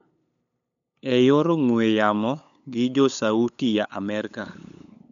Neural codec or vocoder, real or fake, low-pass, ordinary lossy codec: codec, 16 kHz, 8 kbps, FunCodec, trained on LibriTTS, 25 frames a second; fake; 7.2 kHz; none